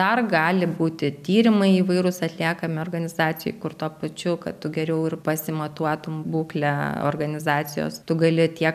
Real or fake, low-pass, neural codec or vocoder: real; 14.4 kHz; none